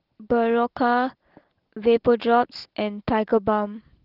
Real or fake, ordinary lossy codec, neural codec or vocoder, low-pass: real; Opus, 16 kbps; none; 5.4 kHz